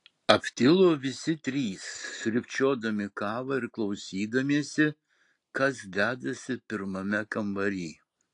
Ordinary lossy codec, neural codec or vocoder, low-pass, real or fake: AAC, 48 kbps; none; 10.8 kHz; real